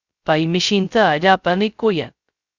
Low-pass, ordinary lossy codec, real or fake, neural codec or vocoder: 7.2 kHz; Opus, 64 kbps; fake; codec, 16 kHz, 0.2 kbps, FocalCodec